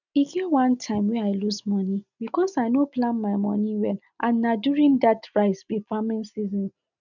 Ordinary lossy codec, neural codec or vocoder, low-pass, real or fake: none; none; 7.2 kHz; real